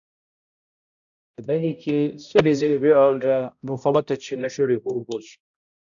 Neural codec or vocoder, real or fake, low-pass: codec, 16 kHz, 0.5 kbps, X-Codec, HuBERT features, trained on balanced general audio; fake; 7.2 kHz